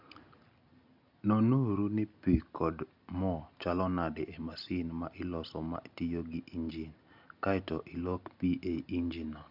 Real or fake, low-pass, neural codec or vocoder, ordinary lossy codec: real; 5.4 kHz; none; none